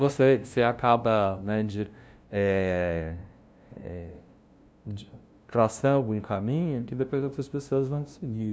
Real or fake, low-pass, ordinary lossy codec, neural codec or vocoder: fake; none; none; codec, 16 kHz, 0.5 kbps, FunCodec, trained on LibriTTS, 25 frames a second